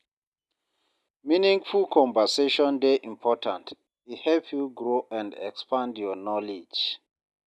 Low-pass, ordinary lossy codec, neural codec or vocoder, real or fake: none; none; none; real